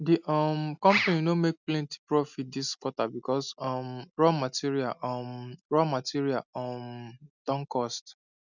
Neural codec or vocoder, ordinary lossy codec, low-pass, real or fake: none; none; 7.2 kHz; real